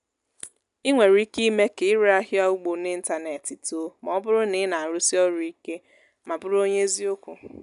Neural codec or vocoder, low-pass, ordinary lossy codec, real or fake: none; 10.8 kHz; none; real